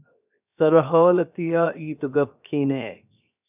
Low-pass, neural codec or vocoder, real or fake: 3.6 kHz; codec, 16 kHz, 0.7 kbps, FocalCodec; fake